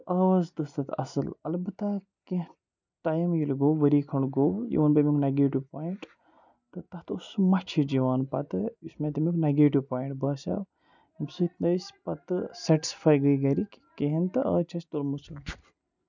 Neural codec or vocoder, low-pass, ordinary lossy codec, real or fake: none; 7.2 kHz; none; real